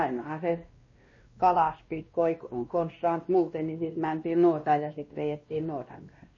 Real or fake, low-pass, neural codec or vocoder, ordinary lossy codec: fake; 7.2 kHz; codec, 16 kHz, 1 kbps, X-Codec, WavLM features, trained on Multilingual LibriSpeech; MP3, 32 kbps